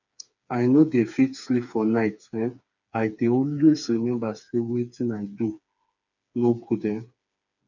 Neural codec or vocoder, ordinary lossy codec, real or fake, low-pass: codec, 16 kHz, 4 kbps, FreqCodec, smaller model; none; fake; 7.2 kHz